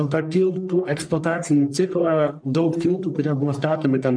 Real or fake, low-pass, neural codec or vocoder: fake; 9.9 kHz; codec, 44.1 kHz, 1.7 kbps, Pupu-Codec